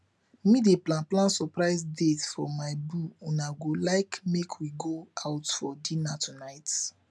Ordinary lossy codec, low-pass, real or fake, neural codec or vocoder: none; none; real; none